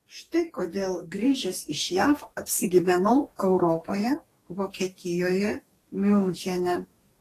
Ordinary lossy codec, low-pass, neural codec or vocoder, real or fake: AAC, 48 kbps; 14.4 kHz; codec, 44.1 kHz, 2.6 kbps, DAC; fake